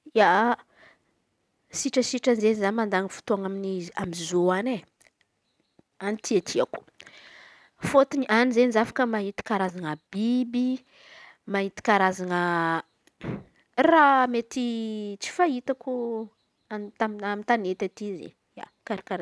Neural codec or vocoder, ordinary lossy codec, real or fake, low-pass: none; none; real; none